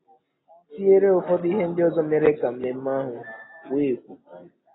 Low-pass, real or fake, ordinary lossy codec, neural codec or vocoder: 7.2 kHz; real; AAC, 16 kbps; none